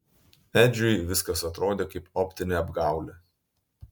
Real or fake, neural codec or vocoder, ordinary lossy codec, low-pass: real; none; MP3, 96 kbps; 19.8 kHz